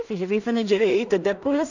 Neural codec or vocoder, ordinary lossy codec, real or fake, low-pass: codec, 16 kHz in and 24 kHz out, 0.4 kbps, LongCat-Audio-Codec, two codebook decoder; none; fake; 7.2 kHz